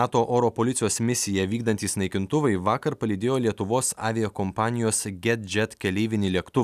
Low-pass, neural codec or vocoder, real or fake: 14.4 kHz; none; real